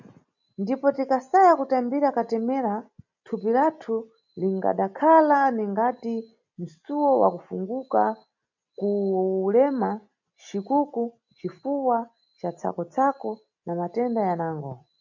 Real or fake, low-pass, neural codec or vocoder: real; 7.2 kHz; none